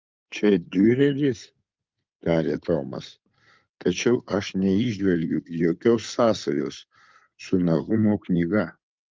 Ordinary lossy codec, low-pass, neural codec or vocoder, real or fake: Opus, 24 kbps; 7.2 kHz; codec, 16 kHz in and 24 kHz out, 2.2 kbps, FireRedTTS-2 codec; fake